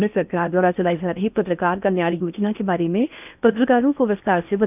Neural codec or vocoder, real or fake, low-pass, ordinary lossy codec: codec, 16 kHz in and 24 kHz out, 0.8 kbps, FocalCodec, streaming, 65536 codes; fake; 3.6 kHz; none